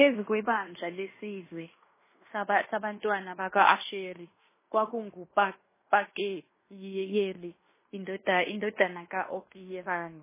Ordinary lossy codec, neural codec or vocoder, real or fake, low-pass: MP3, 16 kbps; codec, 16 kHz in and 24 kHz out, 0.9 kbps, LongCat-Audio-Codec, fine tuned four codebook decoder; fake; 3.6 kHz